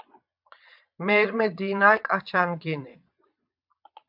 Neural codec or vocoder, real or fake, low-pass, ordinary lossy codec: vocoder, 22.05 kHz, 80 mel bands, Vocos; fake; 5.4 kHz; MP3, 48 kbps